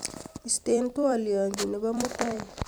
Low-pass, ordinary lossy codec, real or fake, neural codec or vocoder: none; none; fake; vocoder, 44.1 kHz, 128 mel bands every 256 samples, BigVGAN v2